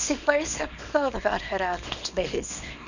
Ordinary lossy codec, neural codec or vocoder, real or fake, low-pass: none; codec, 24 kHz, 0.9 kbps, WavTokenizer, small release; fake; 7.2 kHz